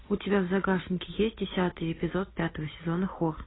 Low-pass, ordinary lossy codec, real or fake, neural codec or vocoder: 7.2 kHz; AAC, 16 kbps; real; none